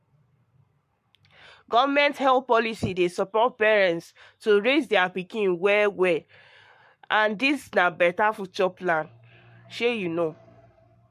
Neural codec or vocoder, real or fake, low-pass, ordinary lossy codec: codec, 44.1 kHz, 7.8 kbps, Pupu-Codec; fake; 14.4 kHz; MP3, 64 kbps